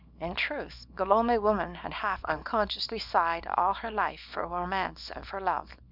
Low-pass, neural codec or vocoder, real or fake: 5.4 kHz; codec, 24 kHz, 0.9 kbps, WavTokenizer, small release; fake